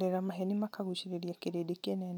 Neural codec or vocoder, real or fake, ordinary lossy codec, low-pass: none; real; none; 19.8 kHz